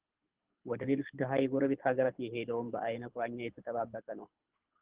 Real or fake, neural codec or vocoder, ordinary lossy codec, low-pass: fake; codec, 24 kHz, 6 kbps, HILCodec; Opus, 16 kbps; 3.6 kHz